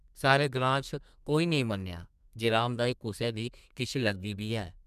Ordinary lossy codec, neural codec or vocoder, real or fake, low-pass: none; codec, 32 kHz, 1.9 kbps, SNAC; fake; 14.4 kHz